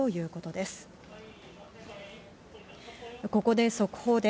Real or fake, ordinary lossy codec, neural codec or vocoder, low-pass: real; none; none; none